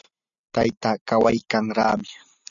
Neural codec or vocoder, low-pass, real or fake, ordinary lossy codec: none; 7.2 kHz; real; MP3, 96 kbps